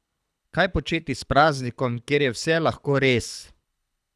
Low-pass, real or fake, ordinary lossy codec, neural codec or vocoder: none; fake; none; codec, 24 kHz, 6 kbps, HILCodec